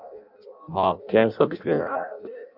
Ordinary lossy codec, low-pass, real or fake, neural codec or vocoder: Opus, 64 kbps; 5.4 kHz; fake; codec, 16 kHz in and 24 kHz out, 0.6 kbps, FireRedTTS-2 codec